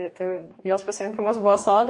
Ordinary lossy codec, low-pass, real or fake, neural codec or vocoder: MP3, 48 kbps; 10.8 kHz; fake; codec, 44.1 kHz, 2.6 kbps, DAC